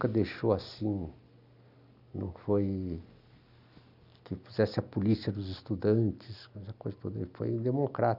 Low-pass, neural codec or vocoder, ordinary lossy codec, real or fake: 5.4 kHz; none; none; real